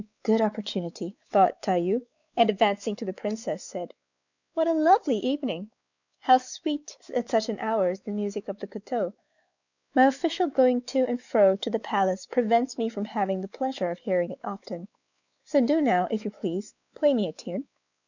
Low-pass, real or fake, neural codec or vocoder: 7.2 kHz; fake; codec, 16 kHz, 4 kbps, X-Codec, WavLM features, trained on Multilingual LibriSpeech